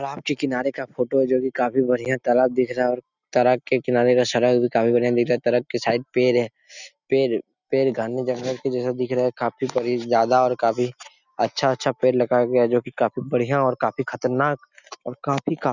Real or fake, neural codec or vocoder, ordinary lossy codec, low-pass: real; none; none; 7.2 kHz